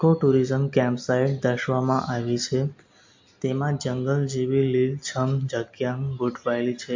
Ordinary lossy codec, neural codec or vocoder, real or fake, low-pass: MP3, 48 kbps; none; real; 7.2 kHz